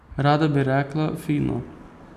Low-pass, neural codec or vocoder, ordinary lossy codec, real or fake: 14.4 kHz; none; none; real